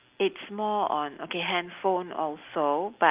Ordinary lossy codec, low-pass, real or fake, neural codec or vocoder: Opus, 24 kbps; 3.6 kHz; real; none